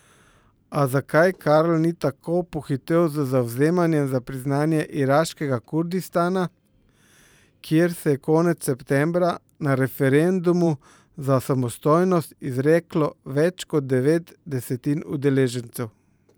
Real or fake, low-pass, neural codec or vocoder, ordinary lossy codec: fake; none; vocoder, 44.1 kHz, 128 mel bands every 512 samples, BigVGAN v2; none